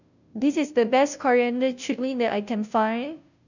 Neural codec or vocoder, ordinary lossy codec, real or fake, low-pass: codec, 16 kHz, 0.5 kbps, FunCodec, trained on Chinese and English, 25 frames a second; none; fake; 7.2 kHz